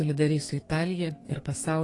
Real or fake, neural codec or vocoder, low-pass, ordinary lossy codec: fake; codec, 32 kHz, 1.9 kbps, SNAC; 10.8 kHz; AAC, 32 kbps